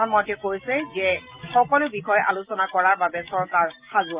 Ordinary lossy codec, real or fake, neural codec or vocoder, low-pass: Opus, 24 kbps; real; none; 3.6 kHz